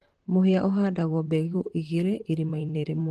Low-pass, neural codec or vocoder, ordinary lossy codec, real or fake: 14.4 kHz; vocoder, 44.1 kHz, 128 mel bands, Pupu-Vocoder; Opus, 16 kbps; fake